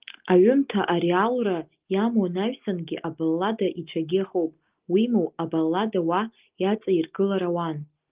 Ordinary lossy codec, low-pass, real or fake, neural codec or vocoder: Opus, 32 kbps; 3.6 kHz; real; none